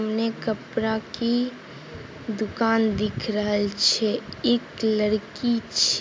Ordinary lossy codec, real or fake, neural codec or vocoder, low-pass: none; real; none; none